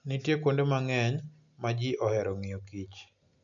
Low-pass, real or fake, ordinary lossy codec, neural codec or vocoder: 7.2 kHz; real; none; none